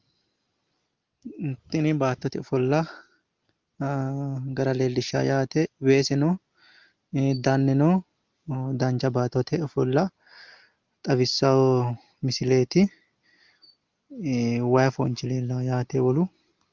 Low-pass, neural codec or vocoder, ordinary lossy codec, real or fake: 7.2 kHz; none; Opus, 32 kbps; real